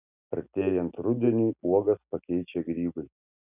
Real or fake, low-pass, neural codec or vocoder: real; 3.6 kHz; none